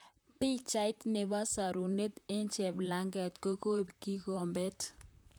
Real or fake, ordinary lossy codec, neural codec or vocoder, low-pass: fake; none; vocoder, 44.1 kHz, 128 mel bands, Pupu-Vocoder; none